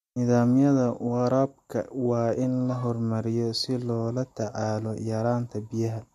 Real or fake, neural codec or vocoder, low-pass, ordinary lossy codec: real; none; 14.4 kHz; MP3, 64 kbps